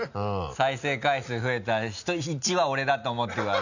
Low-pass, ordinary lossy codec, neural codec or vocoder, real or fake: 7.2 kHz; none; none; real